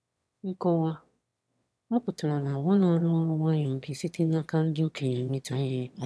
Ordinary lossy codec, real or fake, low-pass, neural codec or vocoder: none; fake; none; autoencoder, 22.05 kHz, a latent of 192 numbers a frame, VITS, trained on one speaker